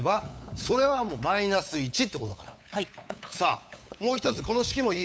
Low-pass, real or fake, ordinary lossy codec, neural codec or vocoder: none; fake; none; codec, 16 kHz, 8 kbps, FunCodec, trained on LibriTTS, 25 frames a second